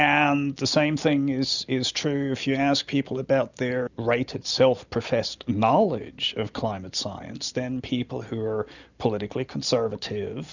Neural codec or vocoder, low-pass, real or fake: none; 7.2 kHz; real